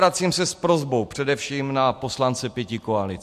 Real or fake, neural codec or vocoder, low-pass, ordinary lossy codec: real; none; 14.4 kHz; MP3, 64 kbps